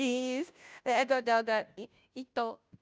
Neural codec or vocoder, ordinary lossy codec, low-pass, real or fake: codec, 16 kHz, 0.5 kbps, FunCodec, trained on Chinese and English, 25 frames a second; none; none; fake